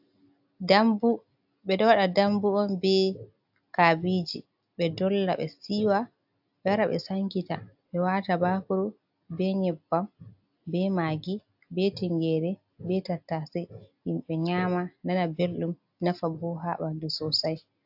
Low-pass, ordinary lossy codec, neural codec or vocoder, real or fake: 5.4 kHz; AAC, 48 kbps; none; real